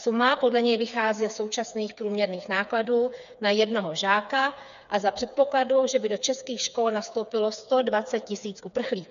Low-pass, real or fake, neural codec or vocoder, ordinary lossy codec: 7.2 kHz; fake; codec, 16 kHz, 4 kbps, FreqCodec, smaller model; MP3, 96 kbps